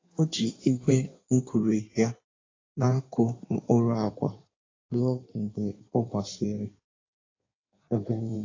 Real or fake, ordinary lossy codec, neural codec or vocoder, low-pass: fake; AAC, 32 kbps; codec, 16 kHz in and 24 kHz out, 1.1 kbps, FireRedTTS-2 codec; 7.2 kHz